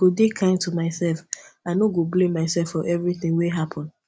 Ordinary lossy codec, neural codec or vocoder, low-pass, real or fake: none; none; none; real